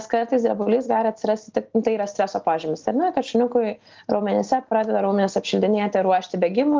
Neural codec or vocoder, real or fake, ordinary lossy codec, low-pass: none; real; Opus, 32 kbps; 7.2 kHz